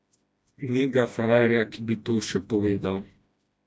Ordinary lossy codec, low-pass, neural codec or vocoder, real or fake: none; none; codec, 16 kHz, 1 kbps, FreqCodec, smaller model; fake